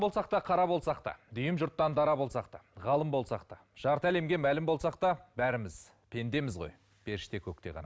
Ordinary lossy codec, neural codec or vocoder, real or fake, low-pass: none; none; real; none